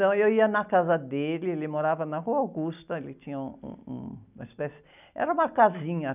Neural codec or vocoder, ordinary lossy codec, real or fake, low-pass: none; none; real; 3.6 kHz